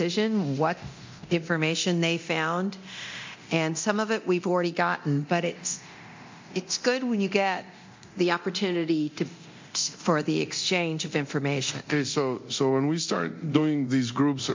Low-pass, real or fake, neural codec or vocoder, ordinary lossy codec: 7.2 kHz; fake; codec, 24 kHz, 0.9 kbps, DualCodec; MP3, 48 kbps